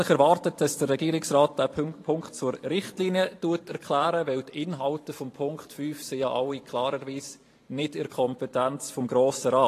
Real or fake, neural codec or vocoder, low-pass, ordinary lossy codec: fake; vocoder, 44.1 kHz, 128 mel bands every 512 samples, BigVGAN v2; 14.4 kHz; AAC, 48 kbps